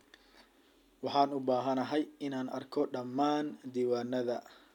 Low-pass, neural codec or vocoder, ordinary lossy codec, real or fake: 19.8 kHz; none; none; real